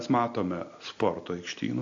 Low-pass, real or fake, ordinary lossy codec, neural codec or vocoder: 7.2 kHz; real; AAC, 48 kbps; none